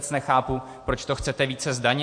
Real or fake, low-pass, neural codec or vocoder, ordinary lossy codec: real; 9.9 kHz; none; MP3, 48 kbps